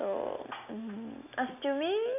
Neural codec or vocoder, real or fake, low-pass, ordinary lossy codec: none; real; 3.6 kHz; none